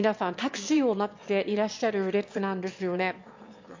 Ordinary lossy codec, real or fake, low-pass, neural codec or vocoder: MP3, 48 kbps; fake; 7.2 kHz; autoencoder, 22.05 kHz, a latent of 192 numbers a frame, VITS, trained on one speaker